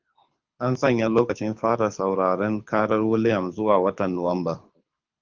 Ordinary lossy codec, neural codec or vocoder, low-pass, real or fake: Opus, 24 kbps; codec, 24 kHz, 6 kbps, HILCodec; 7.2 kHz; fake